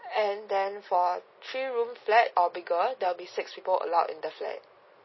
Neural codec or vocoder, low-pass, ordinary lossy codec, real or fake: none; 7.2 kHz; MP3, 24 kbps; real